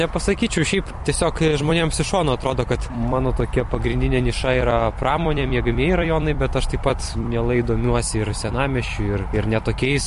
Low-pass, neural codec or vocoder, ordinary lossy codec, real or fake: 14.4 kHz; vocoder, 44.1 kHz, 128 mel bands every 256 samples, BigVGAN v2; MP3, 48 kbps; fake